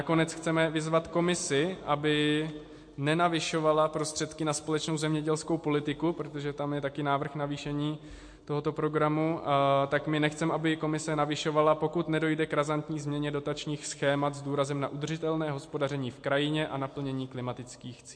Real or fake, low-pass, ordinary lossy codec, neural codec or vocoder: real; 9.9 kHz; MP3, 48 kbps; none